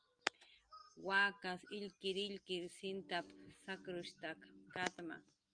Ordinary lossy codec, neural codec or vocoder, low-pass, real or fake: Opus, 32 kbps; none; 9.9 kHz; real